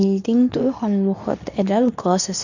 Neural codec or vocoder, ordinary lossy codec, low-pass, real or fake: codec, 24 kHz, 0.9 kbps, WavTokenizer, medium speech release version 2; none; 7.2 kHz; fake